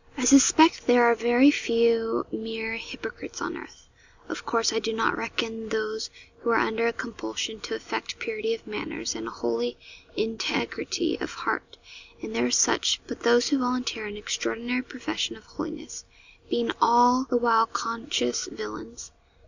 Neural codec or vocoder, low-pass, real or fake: none; 7.2 kHz; real